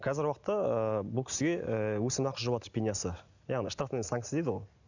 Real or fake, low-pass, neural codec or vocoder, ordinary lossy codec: real; 7.2 kHz; none; none